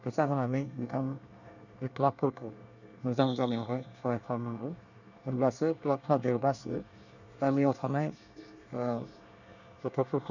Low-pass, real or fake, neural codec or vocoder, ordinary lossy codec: 7.2 kHz; fake; codec, 24 kHz, 1 kbps, SNAC; none